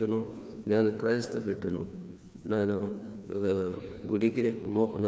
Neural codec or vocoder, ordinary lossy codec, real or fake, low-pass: codec, 16 kHz, 2 kbps, FreqCodec, larger model; none; fake; none